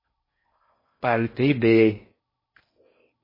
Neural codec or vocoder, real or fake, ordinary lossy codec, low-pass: codec, 16 kHz in and 24 kHz out, 0.6 kbps, FocalCodec, streaming, 4096 codes; fake; MP3, 24 kbps; 5.4 kHz